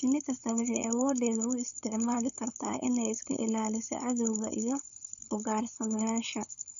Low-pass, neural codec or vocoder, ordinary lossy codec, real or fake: 7.2 kHz; codec, 16 kHz, 4.8 kbps, FACodec; none; fake